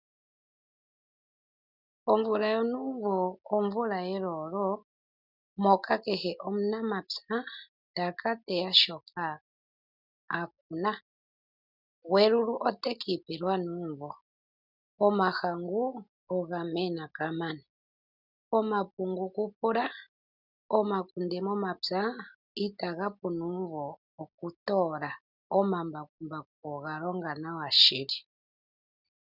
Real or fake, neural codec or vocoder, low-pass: real; none; 5.4 kHz